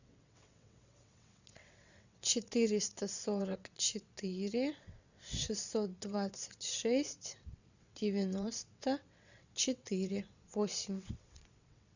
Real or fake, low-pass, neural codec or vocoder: fake; 7.2 kHz; vocoder, 22.05 kHz, 80 mel bands, WaveNeXt